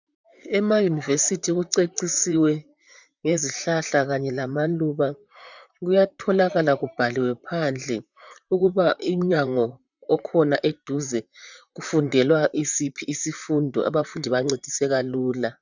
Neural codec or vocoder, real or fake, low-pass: vocoder, 44.1 kHz, 128 mel bands, Pupu-Vocoder; fake; 7.2 kHz